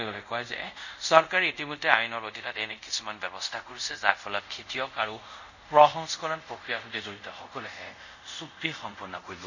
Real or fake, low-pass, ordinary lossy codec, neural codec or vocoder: fake; 7.2 kHz; none; codec, 24 kHz, 0.5 kbps, DualCodec